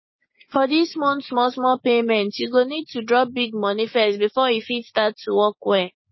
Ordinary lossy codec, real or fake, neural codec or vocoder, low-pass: MP3, 24 kbps; fake; codec, 16 kHz, 6 kbps, DAC; 7.2 kHz